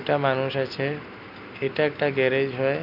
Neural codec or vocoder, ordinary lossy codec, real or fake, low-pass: none; none; real; 5.4 kHz